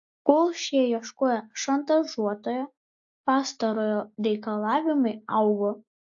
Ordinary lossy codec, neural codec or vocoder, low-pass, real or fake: AAC, 64 kbps; none; 7.2 kHz; real